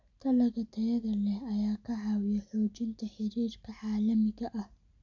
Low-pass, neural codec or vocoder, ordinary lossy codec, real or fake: 7.2 kHz; none; none; real